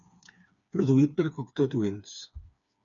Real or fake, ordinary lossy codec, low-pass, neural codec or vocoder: fake; MP3, 96 kbps; 7.2 kHz; codec, 16 kHz, 4 kbps, FreqCodec, smaller model